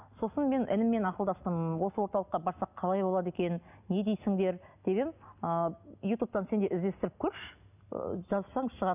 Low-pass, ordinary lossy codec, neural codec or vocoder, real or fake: 3.6 kHz; none; none; real